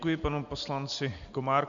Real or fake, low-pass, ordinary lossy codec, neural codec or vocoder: real; 7.2 kHz; AAC, 64 kbps; none